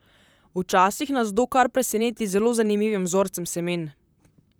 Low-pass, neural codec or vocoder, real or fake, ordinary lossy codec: none; vocoder, 44.1 kHz, 128 mel bands, Pupu-Vocoder; fake; none